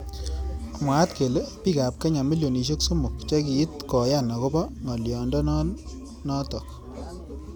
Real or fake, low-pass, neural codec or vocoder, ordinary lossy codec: real; none; none; none